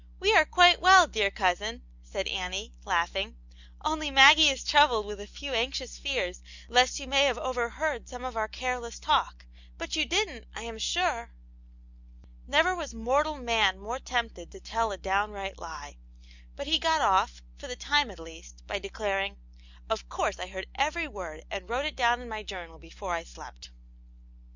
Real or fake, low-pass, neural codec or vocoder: real; 7.2 kHz; none